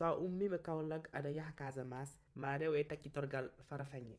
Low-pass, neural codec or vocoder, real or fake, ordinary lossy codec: none; vocoder, 22.05 kHz, 80 mel bands, WaveNeXt; fake; none